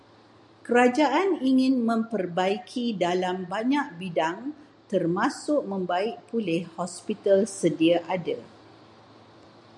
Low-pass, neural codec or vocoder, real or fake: 10.8 kHz; none; real